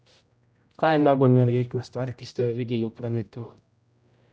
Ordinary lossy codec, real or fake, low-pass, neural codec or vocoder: none; fake; none; codec, 16 kHz, 0.5 kbps, X-Codec, HuBERT features, trained on general audio